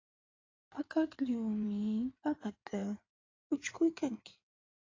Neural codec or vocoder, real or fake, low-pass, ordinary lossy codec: codec, 16 kHz in and 24 kHz out, 2.2 kbps, FireRedTTS-2 codec; fake; 7.2 kHz; AAC, 32 kbps